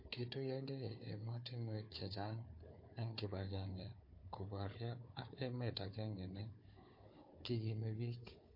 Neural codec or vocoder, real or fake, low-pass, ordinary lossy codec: codec, 16 kHz, 4 kbps, FunCodec, trained on Chinese and English, 50 frames a second; fake; 5.4 kHz; MP3, 32 kbps